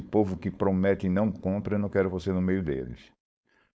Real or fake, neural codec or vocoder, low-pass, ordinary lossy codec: fake; codec, 16 kHz, 4.8 kbps, FACodec; none; none